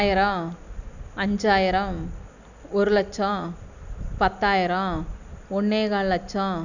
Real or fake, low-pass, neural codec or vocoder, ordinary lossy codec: real; 7.2 kHz; none; none